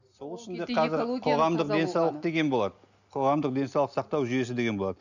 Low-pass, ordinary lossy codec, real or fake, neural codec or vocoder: 7.2 kHz; none; real; none